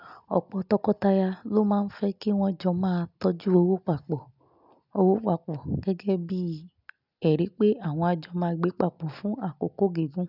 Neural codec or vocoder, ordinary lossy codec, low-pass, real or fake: none; none; 5.4 kHz; real